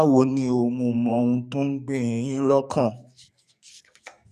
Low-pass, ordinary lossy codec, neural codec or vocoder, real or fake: 14.4 kHz; none; codec, 44.1 kHz, 2.6 kbps, SNAC; fake